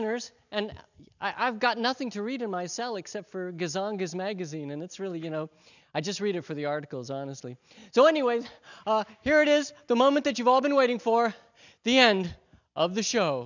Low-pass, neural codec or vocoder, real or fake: 7.2 kHz; none; real